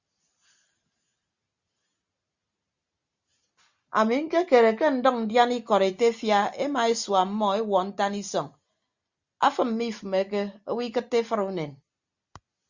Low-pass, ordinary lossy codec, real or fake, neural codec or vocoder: 7.2 kHz; Opus, 64 kbps; real; none